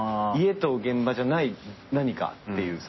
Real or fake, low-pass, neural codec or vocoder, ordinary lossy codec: real; 7.2 kHz; none; MP3, 24 kbps